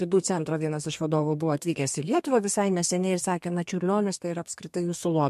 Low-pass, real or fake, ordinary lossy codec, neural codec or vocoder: 14.4 kHz; fake; MP3, 64 kbps; codec, 32 kHz, 1.9 kbps, SNAC